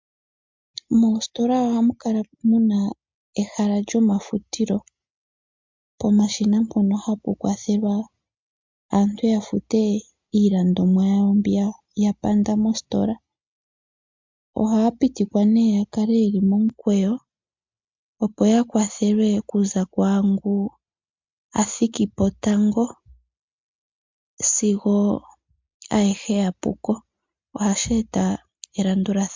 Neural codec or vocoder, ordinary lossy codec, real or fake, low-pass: none; MP3, 64 kbps; real; 7.2 kHz